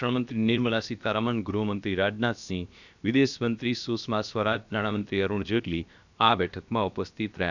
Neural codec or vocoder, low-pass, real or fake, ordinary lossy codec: codec, 16 kHz, about 1 kbps, DyCAST, with the encoder's durations; 7.2 kHz; fake; none